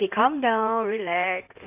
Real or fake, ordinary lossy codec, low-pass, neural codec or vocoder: fake; none; 3.6 kHz; codec, 16 kHz in and 24 kHz out, 2.2 kbps, FireRedTTS-2 codec